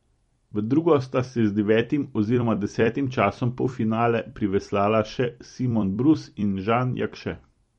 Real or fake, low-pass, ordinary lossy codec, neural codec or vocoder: fake; 19.8 kHz; MP3, 48 kbps; vocoder, 44.1 kHz, 128 mel bands every 256 samples, BigVGAN v2